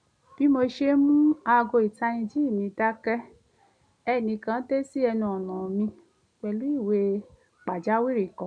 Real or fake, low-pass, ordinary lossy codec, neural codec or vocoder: real; 9.9 kHz; none; none